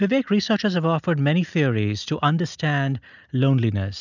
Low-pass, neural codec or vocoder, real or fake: 7.2 kHz; none; real